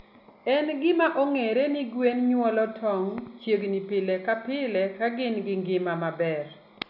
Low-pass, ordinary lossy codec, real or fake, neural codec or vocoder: 5.4 kHz; none; real; none